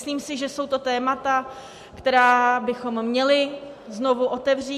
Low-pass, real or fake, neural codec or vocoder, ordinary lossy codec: 14.4 kHz; real; none; MP3, 64 kbps